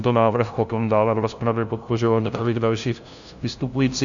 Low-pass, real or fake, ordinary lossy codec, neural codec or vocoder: 7.2 kHz; fake; Opus, 64 kbps; codec, 16 kHz, 0.5 kbps, FunCodec, trained on LibriTTS, 25 frames a second